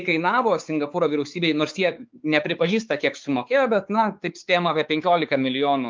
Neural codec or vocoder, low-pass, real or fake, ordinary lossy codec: codec, 16 kHz, 4 kbps, X-Codec, WavLM features, trained on Multilingual LibriSpeech; 7.2 kHz; fake; Opus, 32 kbps